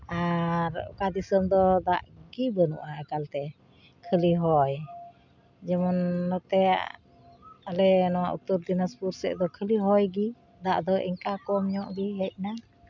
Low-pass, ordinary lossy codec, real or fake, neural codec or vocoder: 7.2 kHz; none; real; none